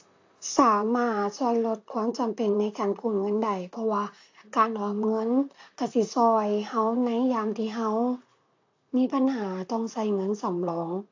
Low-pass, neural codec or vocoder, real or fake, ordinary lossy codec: 7.2 kHz; vocoder, 44.1 kHz, 128 mel bands, Pupu-Vocoder; fake; AAC, 48 kbps